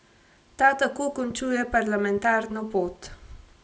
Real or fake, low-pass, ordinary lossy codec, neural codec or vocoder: real; none; none; none